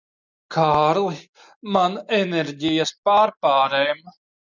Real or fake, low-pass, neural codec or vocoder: real; 7.2 kHz; none